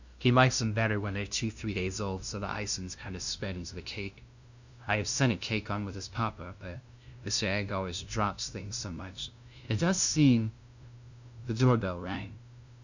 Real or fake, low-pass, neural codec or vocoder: fake; 7.2 kHz; codec, 16 kHz, 0.5 kbps, FunCodec, trained on LibriTTS, 25 frames a second